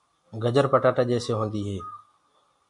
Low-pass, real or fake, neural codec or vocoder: 10.8 kHz; real; none